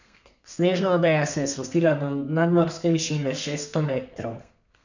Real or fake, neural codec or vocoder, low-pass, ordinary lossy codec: fake; codec, 32 kHz, 1.9 kbps, SNAC; 7.2 kHz; none